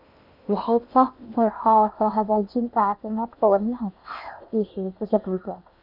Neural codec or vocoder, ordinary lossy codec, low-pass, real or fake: codec, 16 kHz in and 24 kHz out, 0.8 kbps, FocalCodec, streaming, 65536 codes; none; 5.4 kHz; fake